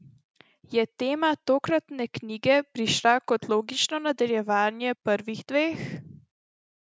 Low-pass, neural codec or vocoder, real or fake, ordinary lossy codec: none; none; real; none